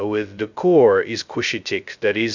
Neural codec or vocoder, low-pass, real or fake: codec, 16 kHz, 0.2 kbps, FocalCodec; 7.2 kHz; fake